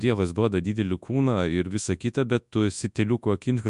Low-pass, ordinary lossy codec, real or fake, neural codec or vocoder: 10.8 kHz; MP3, 96 kbps; fake; codec, 24 kHz, 0.9 kbps, WavTokenizer, large speech release